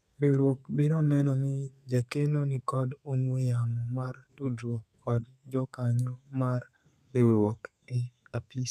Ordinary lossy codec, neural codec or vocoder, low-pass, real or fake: none; codec, 32 kHz, 1.9 kbps, SNAC; 14.4 kHz; fake